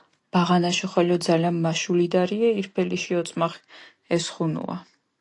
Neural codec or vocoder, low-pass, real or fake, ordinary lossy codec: none; 10.8 kHz; real; AAC, 48 kbps